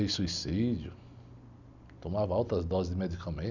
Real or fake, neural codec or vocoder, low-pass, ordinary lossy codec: real; none; 7.2 kHz; none